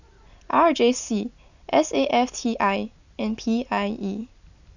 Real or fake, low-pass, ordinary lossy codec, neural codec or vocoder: real; 7.2 kHz; none; none